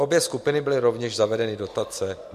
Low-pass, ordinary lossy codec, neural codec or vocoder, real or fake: 14.4 kHz; MP3, 64 kbps; none; real